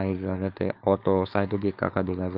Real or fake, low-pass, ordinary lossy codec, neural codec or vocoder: fake; 5.4 kHz; Opus, 32 kbps; codec, 16 kHz, 4.8 kbps, FACodec